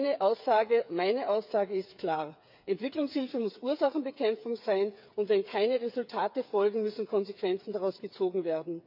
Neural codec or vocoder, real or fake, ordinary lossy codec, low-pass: codec, 16 kHz, 8 kbps, FreqCodec, smaller model; fake; none; 5.4 kHz